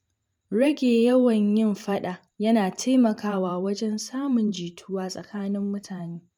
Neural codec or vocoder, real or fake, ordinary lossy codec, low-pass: vocoder, 44.1 kHz, 128 mel bands every 512 samples, BigVGAN v2; fake; none; 19.8 kHz